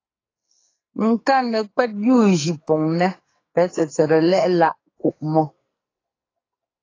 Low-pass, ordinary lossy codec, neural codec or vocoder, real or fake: 7.2 kHz; AAC, 32 kbps; codec, 44.1 kHz, 2.6 kbps, SNAC; fake